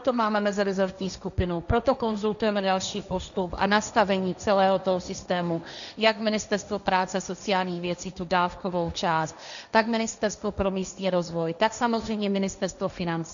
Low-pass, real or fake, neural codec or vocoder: 7.2 kHz; fake; codec, 16 kHz, 1.1 kbps, Voila-Tokenizer